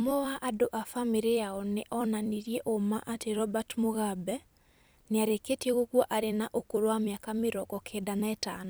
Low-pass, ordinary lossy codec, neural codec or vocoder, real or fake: none; none; vocoder, 44.1 kHz, 128 mel bands every 256 samples, BigVGAN v2; fake